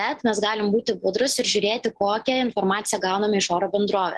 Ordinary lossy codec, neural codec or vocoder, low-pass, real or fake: Opus, 16 kbps; none; 10.8 kHz; real